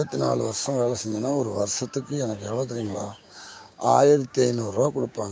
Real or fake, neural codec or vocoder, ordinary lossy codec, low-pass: fake; codec, 16 kHz, 6 kbps, DAC; none; none